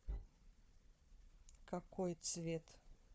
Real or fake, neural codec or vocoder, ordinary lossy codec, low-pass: fake; codec, 16 kHz, 4 kbps, FunCodec, trained on Chinese and English, 50 frames a second; none; none